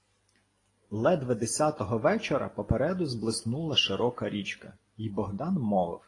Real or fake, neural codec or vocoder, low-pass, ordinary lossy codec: real; none; 10.8 kHz; AAC, 32 kbps